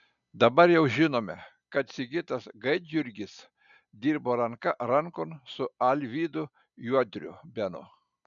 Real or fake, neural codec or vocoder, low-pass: real; none; 7.2 kHz